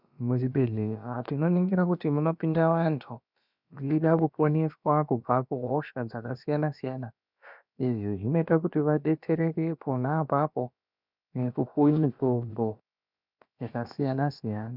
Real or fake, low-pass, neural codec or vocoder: fake; 5.4 kHz; codec, 16 kHz, about 1 kbps, DyCAST, with the encoder's durations